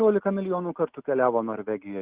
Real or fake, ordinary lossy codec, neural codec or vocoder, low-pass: real; Opus, 16 kbps; none; 3.6 kHz